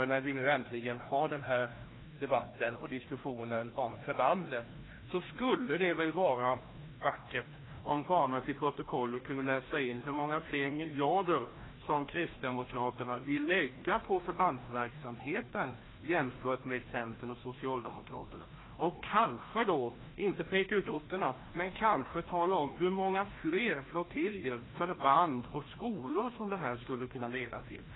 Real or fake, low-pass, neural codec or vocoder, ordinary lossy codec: fake; 7.2 kHz; codec, 16 kHz, 1 kbps, FreqCodec, larger model; AAC, 16 kbps